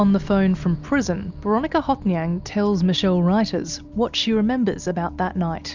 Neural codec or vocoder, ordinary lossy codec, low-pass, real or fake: none; Opus, 64 kbps; 7.2 kHz; real